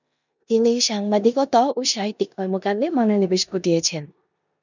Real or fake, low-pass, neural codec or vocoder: fake; 7.2 kHz; codec, 16 kHz in and 24 kHz out, 0.9 kbps, LongCat-Audio-Codec, four codebook decoder